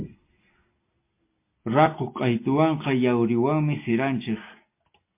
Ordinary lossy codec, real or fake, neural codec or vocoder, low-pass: MP3, 24 kbps; real; none; 3.6 kHz